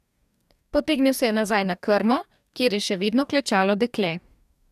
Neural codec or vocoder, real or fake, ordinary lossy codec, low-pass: codec, 44.1 kHz, 2.6 kbps, DAC; fake; none; 14.4 kHz